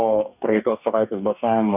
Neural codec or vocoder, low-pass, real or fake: codec, 44.1 kHz, 2.6 kbps, DAC; 3.6 kHz; fake